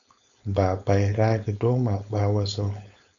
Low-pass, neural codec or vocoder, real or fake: 7.2 kHz; codec, 16 kHz, 4.8 kbps, FACodec; fake